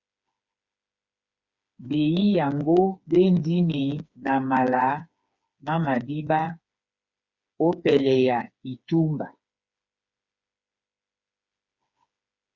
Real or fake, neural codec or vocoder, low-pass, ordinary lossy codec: fake; codec, 16 kHz, 4 kbps, FreqCodec, smaller model; 7.2 kHz; Opus, 64 kbps